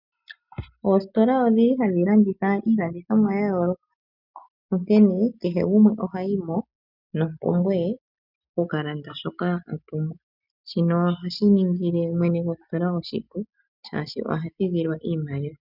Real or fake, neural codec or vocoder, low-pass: real; none; 5.4 kHz